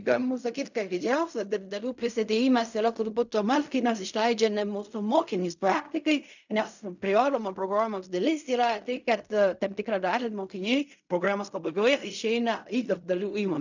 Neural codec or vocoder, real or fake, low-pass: codec, 16 kHz in and 24 kHz out, 0.4 kbps, LongCat-Audio-Codec, fine tuned four codebook decoder; fake; 7.2 kHz